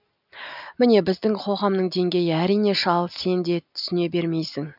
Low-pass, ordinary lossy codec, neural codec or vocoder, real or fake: 5.4 kHz; none; none; real